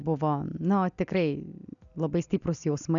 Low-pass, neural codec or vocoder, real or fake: 7.2 kHz; none; real